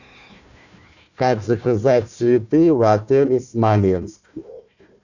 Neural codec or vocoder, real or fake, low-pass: codec, 16 kHz, 1 kbps, FunCodec, trained on Chinese and English, 50 frames a second; fake; 7.2 kHz